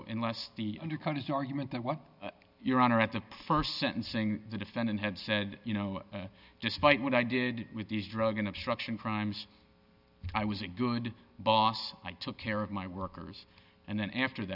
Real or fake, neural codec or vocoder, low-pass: real; none; 5.4 kHz